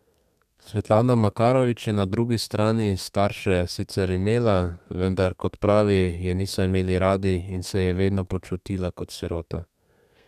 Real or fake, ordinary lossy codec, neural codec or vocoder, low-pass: fake; none; codec, 32 kHz, 1.9 kbps, SNAC; 14.4 kHz